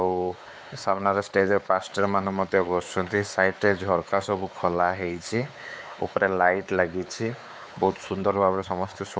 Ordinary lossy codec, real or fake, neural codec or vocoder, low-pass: none; fake; codec, 16 kHz, 4 kbps, X-Codec, WavLM features, trained on Multilingual LibriSpeech; none